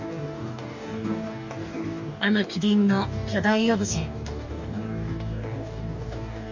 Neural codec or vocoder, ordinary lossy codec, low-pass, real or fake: codec, 44.1 kHz, 2.6 kbps, DAC; none; 7.2 kHz; fake